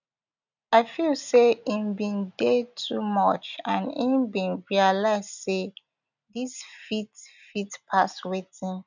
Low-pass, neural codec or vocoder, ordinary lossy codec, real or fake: 7.2 kHz; none; none; real